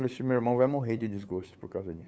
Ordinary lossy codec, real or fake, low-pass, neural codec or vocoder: none; fake; none; codec, 16 kHz, 8 kbps, FunCodec, trained on LibriTTS, 25 frames a second